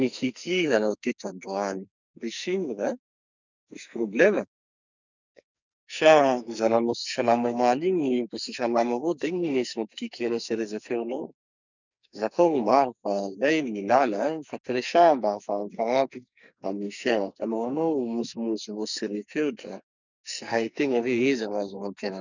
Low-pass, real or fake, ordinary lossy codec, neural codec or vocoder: 7.2 kHz; fake; none; codec, 44.1 kHz, 2.6 kbps, SNAC